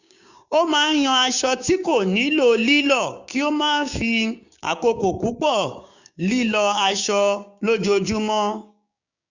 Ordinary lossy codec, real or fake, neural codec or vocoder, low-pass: none; fake; codec, 16 kHz, 6 kbps, DAC; 7.2 kHz